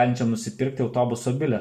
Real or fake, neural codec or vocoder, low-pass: real; none; 14.4 kHz